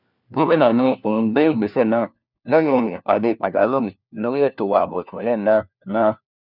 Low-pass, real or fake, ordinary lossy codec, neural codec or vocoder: 5.4 kHz; fake; none; codec, 16 kHz, 1 kbps, FunCodec, trained on LibriTTS, 50 frames a second